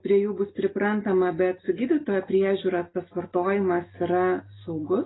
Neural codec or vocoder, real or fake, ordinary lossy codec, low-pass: none; real; AAC, 16 kbps; 7.2 kHz